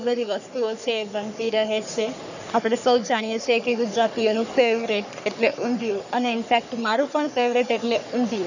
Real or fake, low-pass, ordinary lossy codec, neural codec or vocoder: fake; 7.2 kHz; none; codec, 44.1 kHz, 3.4 kbps, Pupu-Codec